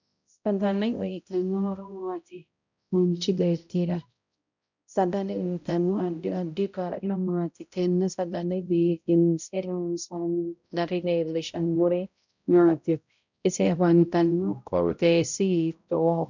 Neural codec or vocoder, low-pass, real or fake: codec, 16 kHz, 0.5 kbps, X-Codec, HuBERT features, trained on balanced general audio; 7.2 kHz; fake